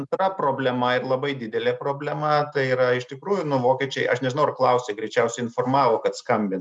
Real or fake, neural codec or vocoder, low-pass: real; none; 10.8 kHz